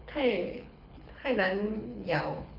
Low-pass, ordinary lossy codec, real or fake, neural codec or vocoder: 5.4 kHz; none; fake; codec, 24 kHz, 6 kbps, HILCodec